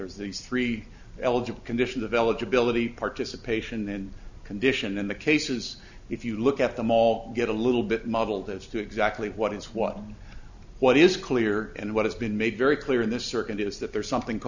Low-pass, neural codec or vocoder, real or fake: 7.2 kHz; none; real